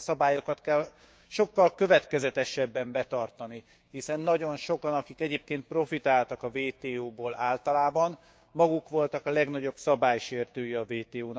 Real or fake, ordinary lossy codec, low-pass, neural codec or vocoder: fake; none; none; codec, 16 kHz, 6 kbps, DAC